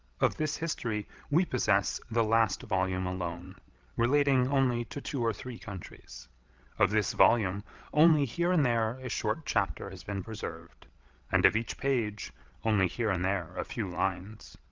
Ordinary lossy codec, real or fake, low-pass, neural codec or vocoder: Opus, 32 kbps; fake; 7.2 kHz; codec, 16 kHz, 16 kbps, FreqCodec, larger model